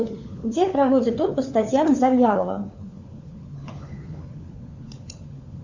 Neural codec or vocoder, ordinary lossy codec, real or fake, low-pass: codec, 16 kHz, 4 kbps, FunCodec, trained on LibriTTS, 50 frames a second; Opus, 64 kbps; fake; 7.2 kHz